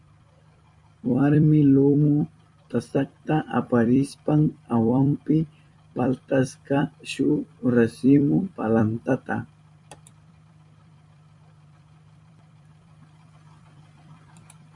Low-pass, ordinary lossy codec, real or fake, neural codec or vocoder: 10.8 kHz; MP3, 64 kbps; fake; vocoder, 44.1 kHz, 128 mel bands every 256 samples, BigVGAN v2